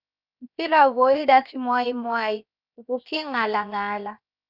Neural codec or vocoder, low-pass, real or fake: codec, 16 kHz, 0.7 kbps, FocalCodec; 5.4 kHz; fake